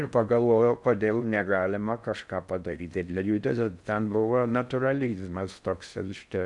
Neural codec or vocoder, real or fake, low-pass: codec, 16 kHz in and 24 kHz out, 0.6 kbps, FocalCodec, streaming, 4096 codes; fake; 10.8 kHz